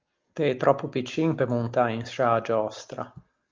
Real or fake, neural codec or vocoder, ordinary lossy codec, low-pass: real; none; Opus, 32 kbps; 7.2 kHz